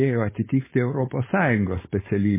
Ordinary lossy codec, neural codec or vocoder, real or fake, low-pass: MP3, 16 kbps; codec, 16 kHz, 4.8 kbps, FACodec; fake; 3.6 kHz